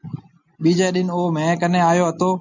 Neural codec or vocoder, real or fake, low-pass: none; real; 7.2 kHz